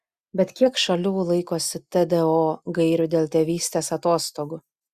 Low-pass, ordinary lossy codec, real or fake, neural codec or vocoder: 14.4 kHz; Opus, 64 kbps; real; none